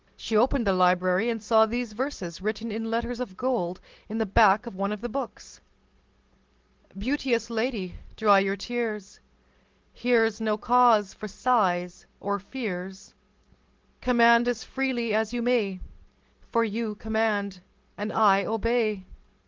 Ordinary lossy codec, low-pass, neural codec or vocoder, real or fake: Opus, 16 kbps; 7.2 kHz; none; real